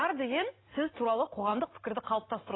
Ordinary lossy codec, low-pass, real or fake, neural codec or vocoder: AAC, 16 kbps; 7.2 kHz; real; none